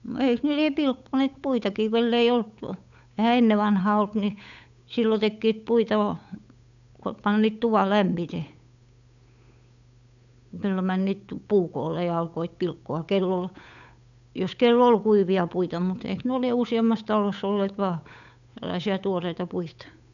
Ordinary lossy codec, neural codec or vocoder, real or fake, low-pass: none; codec, 16 kHz, 8 kbps, FunCodec, trained on Chinese and English, 25 frames a second; fake; 7.2 kHz